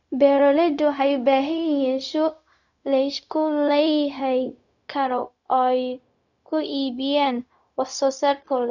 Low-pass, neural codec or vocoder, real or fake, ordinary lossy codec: 7.2 kHz; codec, 16 kHz, 0.4 kbps, LongCat-Audio-Codec; fake; none